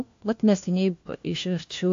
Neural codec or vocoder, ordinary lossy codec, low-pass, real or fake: codec, 16 kHz, 1 kbps, FunCodec, trained on LibriTTS, 50 frames a second; AAC, 48 kbps; 7.2 kHz; fake